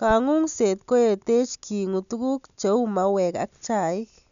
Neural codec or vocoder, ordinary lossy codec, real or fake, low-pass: none; none; real; 7.2 kHz